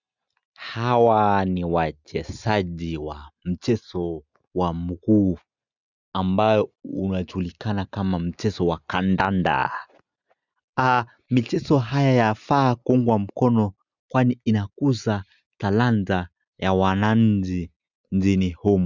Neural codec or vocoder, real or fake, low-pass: none; real; 7.2 kHz